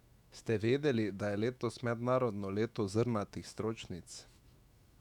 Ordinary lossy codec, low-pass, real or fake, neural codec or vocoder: none; 19.8 kHz; fake; autoencoder, 48 kHz, 128 numbers a frame, DAC-VAE, trained on Japanese speech